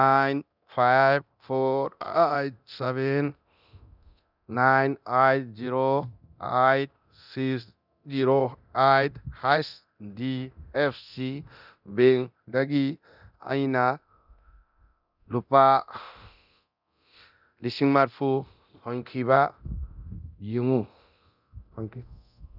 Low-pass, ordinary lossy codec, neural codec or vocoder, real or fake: 5.4 kHz; none; codec, 24 kHz, 0.9 kbps, DualCodec; fake